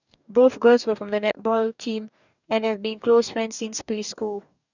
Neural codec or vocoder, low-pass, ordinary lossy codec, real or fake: codec, 44.1 kHz, 2.6 kbps, DAC; 7.2 kHz; none; fake